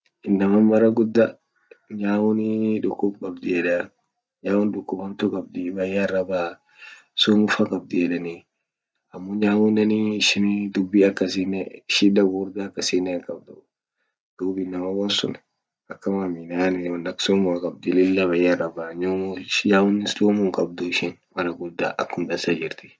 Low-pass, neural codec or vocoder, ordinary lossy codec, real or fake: none; none; none; real